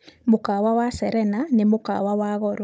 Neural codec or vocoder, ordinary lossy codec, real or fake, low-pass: codec, 16 kHz, 16 kbps, FunCodec, trained on Chinese and English, 50 frames a second; none; fake; none